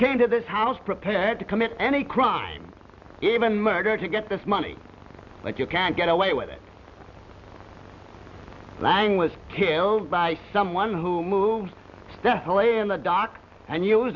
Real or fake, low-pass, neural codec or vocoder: real; 7.2 kHz; none